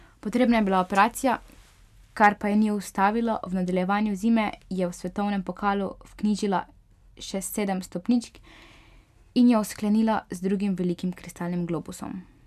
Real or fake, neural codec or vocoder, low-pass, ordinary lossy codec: real; none; 14.4 kHz; none